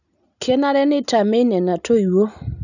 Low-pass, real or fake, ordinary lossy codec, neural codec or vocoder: 7.2 kHz; real; none; none